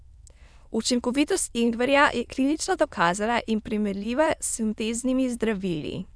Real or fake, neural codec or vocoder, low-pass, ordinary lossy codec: fake; autoencoder, 22.05 kHz, a latent of 192 numbers a frame, VITS, trained on many speakers; none; none